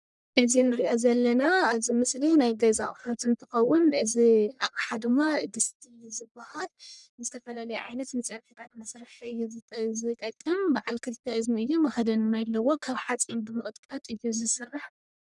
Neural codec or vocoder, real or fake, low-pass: codec, 44.1 kHz, 1.7 kbps, Pupu-Codec; fake; 10.8 kHz